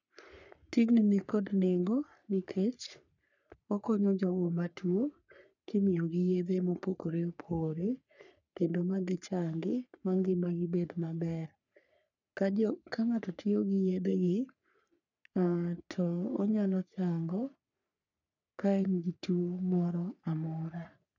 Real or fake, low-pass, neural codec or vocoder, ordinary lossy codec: fake; 7.2 kHz; codec, 44.1 kHz, 3.4 kbps, Pupu-Codec; none